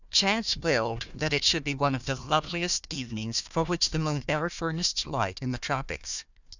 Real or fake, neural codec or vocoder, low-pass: fake; codec, 16 kHz, 1 kbps, FunCodec, trained on Chinese and English, 50 frames a second; 7.2 kHz